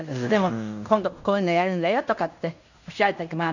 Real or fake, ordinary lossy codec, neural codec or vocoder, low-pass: fake; none; codec, 16 kHz in and 24 kHz out, 0.9 kbps, LongCat-Audio-Codec, fine tuned four codebook decoder; 7.2 kHz